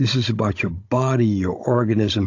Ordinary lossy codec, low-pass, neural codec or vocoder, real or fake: AAC, 48 kbps; 7.2 kHz; codec, 16 kHz, 16 kbps, FunCodec, trained on Chinese and English, 50 frames a second; fake